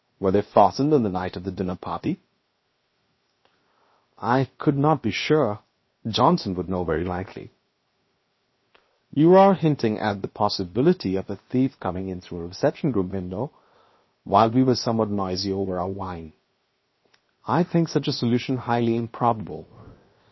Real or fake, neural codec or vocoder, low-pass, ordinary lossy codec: fake; codec, 16 kHz, 0.7 kbps, FocalCodec; 7.2 kHz; MP3, 24 kbps